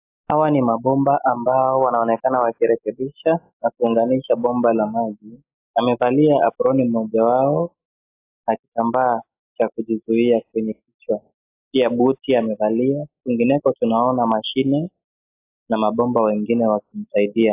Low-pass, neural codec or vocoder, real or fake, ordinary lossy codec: 3.6 kHz; none; real; AAC, 24 kbps